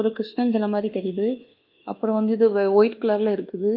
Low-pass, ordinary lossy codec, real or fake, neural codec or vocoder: 5.4 kHz; Opus, 24 kbps; fake; codec, 24 kHz, 1.2 kbps, DualCodec